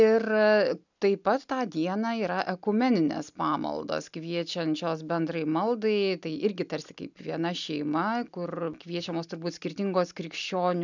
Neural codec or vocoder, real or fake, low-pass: none; real; 7.2 kHz